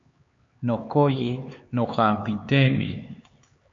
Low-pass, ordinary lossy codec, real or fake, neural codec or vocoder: 7.2 kHz; MP3, 48 kbps; fake; codec, 16 kHz, 4 kbps, X-Codec, HuBERT features, trained on LibriSpeech